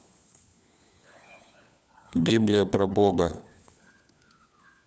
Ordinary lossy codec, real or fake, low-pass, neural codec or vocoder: none; fake; none; codec, 16 kHz, 4 kbps, FunCodec, trained on LibriTTS, 50 frames a second